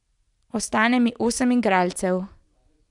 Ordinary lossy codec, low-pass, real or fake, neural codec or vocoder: none; 10.8 kHz; real; none